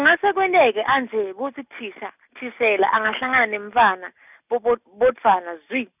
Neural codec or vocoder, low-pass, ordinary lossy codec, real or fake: none; 3.6 kHz; none; real